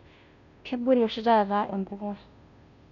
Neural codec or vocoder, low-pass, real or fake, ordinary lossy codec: codec, 16 kHz, 0.5 kbps, FunCodec, trained on Chinese and English, 25 frames a second; 7.2 kHz; fake; none